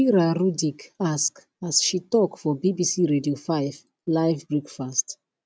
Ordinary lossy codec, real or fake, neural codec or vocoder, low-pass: none; real; none; none